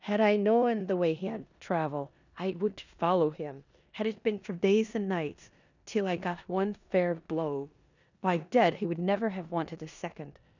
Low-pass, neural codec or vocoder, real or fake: 7.2 kHz; codec, 16 kHz in and 24 kHz out, 0.9 kbps, LongCat-Audio-Codec, four codebook decoder; fake